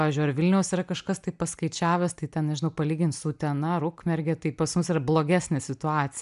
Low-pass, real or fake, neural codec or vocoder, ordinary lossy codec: 10.8 kHz; real; none; MP3, 96 kbps